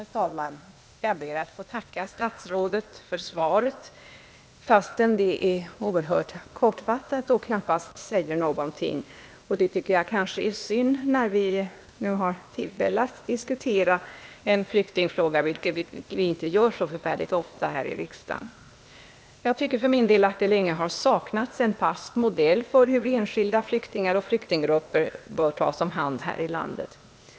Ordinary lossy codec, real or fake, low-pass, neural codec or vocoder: none; fake; none; codec, 16 kHz, 0.8 kbps, ZipCodec